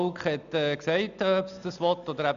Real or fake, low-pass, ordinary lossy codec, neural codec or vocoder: real; 7.2 kHz; none; none